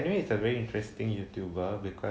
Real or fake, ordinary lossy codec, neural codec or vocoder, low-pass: real; none; none; none